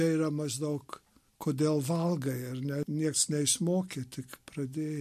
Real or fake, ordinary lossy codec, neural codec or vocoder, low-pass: real; MP3, 64 kbps; none; 14.4 kHz